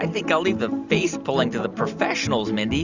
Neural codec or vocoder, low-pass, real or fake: none; 7.2 kHz; real